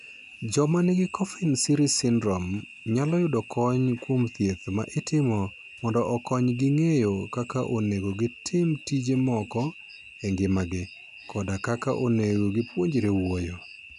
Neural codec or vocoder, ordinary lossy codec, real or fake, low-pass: none; none; real; 10.8 kHz